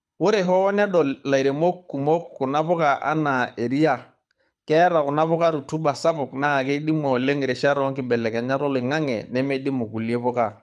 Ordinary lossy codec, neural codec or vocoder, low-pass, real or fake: none; codec, 24 kHz, 6 kbps, HILCodec; none; fake